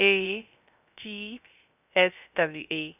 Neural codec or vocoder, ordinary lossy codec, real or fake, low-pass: codec, 16 kHz, 0.3 kbps, FocalCodec; none; fake; 3.6 kHz